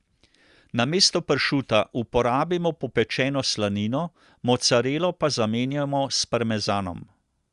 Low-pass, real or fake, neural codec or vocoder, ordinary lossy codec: 10.8 kHz; real; none; Opus, 64 kbps